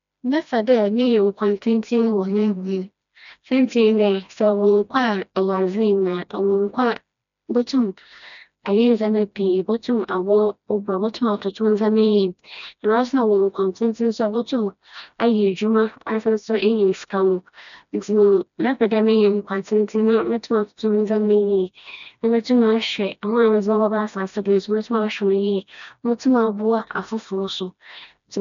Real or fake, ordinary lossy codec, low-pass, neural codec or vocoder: fake; none; 7.2 kHz; codec, 16 kHz, 1 kbps, FreqCodec, smaller model